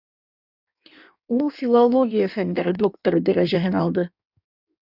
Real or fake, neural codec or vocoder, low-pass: fake; codec, 16 kHz in and 24 kHz out, 1.1 kbps, FireRedTTS-2 codec; 5.4 kHz